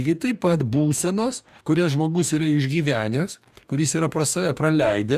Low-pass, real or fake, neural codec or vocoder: 14.4 kHz; fake; codec, 44.1 kHz, 2.6 kbps, DAC